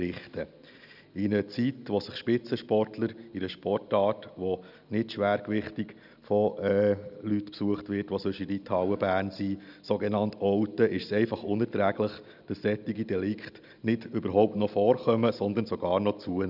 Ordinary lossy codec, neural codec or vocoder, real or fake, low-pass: none; none; real; 5.4 kHz